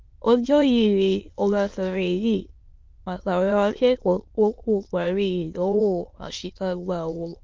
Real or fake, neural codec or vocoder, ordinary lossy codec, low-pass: fake; autoencoder, 22.05 kHz, a latent of 192 numbers a frame, VITS, trained on many speakers; Opus, 32 kbps; 7.2 kHz